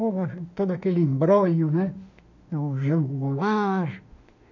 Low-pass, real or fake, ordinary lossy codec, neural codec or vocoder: 7.2 kHz; fake; none; autoencoder, 48 kHz, 32 numbers a frame, DAC-VAE, trained on Japanese speech